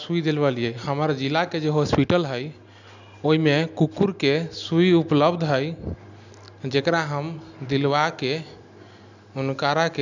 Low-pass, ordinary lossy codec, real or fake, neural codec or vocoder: 7.2 kHz; none; real; none